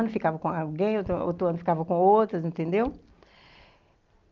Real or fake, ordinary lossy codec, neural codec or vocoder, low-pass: real; Opus, 32 kbps; none; 7.2 kHz